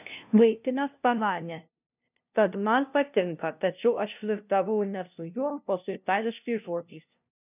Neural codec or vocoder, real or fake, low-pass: codec, 16 kHz, 0.5 kbps, FunCodec, trained on LibriTTS, 25 frames a second; fake; 3.6 kHz